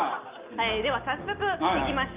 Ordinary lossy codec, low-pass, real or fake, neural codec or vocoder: Opus, 24 kbps; 3.6 kHz; real; none